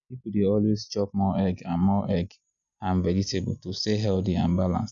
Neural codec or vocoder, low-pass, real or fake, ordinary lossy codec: none; 7.2 kHz; real; none